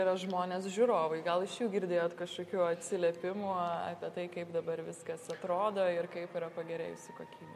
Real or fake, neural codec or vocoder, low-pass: real; none; 14.4 kHz